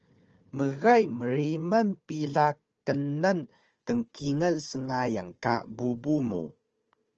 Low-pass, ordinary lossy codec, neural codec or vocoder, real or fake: 7.2 kHz; Opus, 24 kbps; codec, 16 kHz, 4 kbps, FunCodec, trained on Chinese and English, 50 frames a second; fake